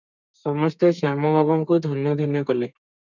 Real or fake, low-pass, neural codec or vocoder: fake; 7.2 kHz; codec, 32 kHz, 1.9 kbps, SNAC